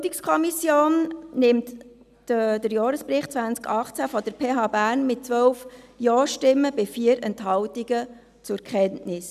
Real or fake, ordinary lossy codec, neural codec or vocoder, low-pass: real; none; none; 14.4 kHz